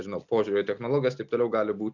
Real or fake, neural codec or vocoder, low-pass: real; none; 7.2 kHz